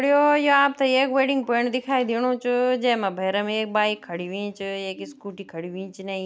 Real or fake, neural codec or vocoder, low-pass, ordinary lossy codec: real; none; none; none